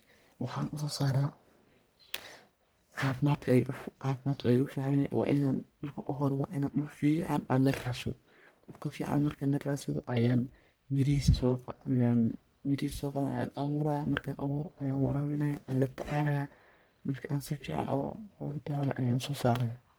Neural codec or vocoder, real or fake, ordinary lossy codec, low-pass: codec, 44.1 kHz, 1.7 kbps, Pupu-Codec; fake; none; none